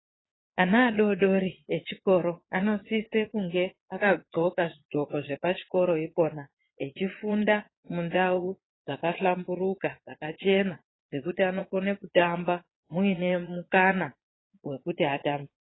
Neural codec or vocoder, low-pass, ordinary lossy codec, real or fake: vocoder, 22.05 kHz, 80 mel bands, Vocos; 7.2 kHz; AAC, 16 kbps; fake